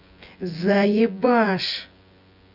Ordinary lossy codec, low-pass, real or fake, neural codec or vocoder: Opus, 64 kbps; 5.4 kHz; fake; vocoder, 24 kHz, 100 mel bands, Vocos